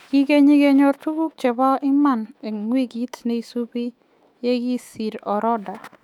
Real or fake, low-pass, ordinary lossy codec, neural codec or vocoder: fake; 19.8 kHz; none; autoencoder, 48 kHz, 128 numbers a frame, DAC-VAE, trained on Japanese speech